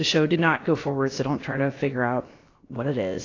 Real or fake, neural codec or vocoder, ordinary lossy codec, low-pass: fake; codec, 16 kHz, about 1 kbps, DyCAST, with the encoder's durations; AAC, 32 kbps; 7.2 kHz